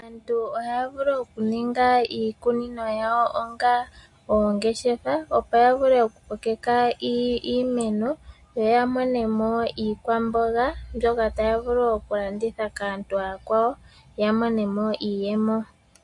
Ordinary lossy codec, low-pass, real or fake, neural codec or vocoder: MP3, 48 kbps; 10.8 kHz; real; none